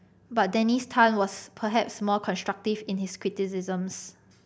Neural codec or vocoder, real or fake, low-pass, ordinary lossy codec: none; real; none; none